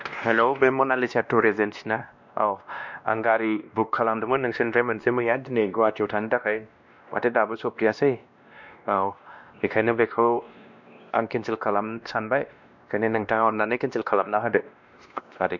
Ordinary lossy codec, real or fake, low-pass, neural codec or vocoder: none; fake; 7.2 kHz; codec, 16 kHz, 1 kbps, X-Codec, WavLM features, trained on Multilingual LibriSpeech